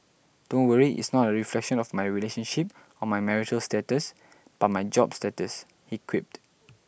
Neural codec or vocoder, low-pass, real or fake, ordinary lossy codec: none; none; real; none